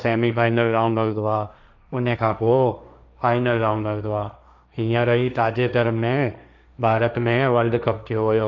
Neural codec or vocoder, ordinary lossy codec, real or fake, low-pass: codec, 16 kHz, 1.1 kbps, Voila-Tokenizer; none; fake; 7.2 kHz